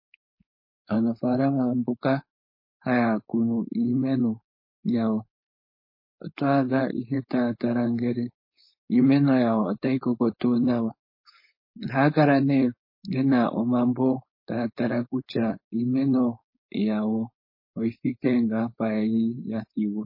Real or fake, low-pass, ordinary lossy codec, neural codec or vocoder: fake; 5.4 kHz; MP3, 24 kbps; codec, 16 kHz, 4.8 kbps, FACodec